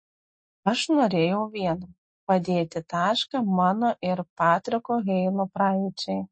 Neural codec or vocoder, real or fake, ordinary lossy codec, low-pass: none; real; MP3, 32 kbps; 9.9 kHz